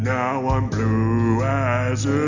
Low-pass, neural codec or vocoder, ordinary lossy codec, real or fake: 7.2 kHz; none; Opus, 64 kbps; real